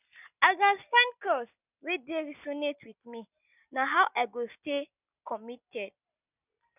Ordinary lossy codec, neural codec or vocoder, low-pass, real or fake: none; none; 3.6 kHz; real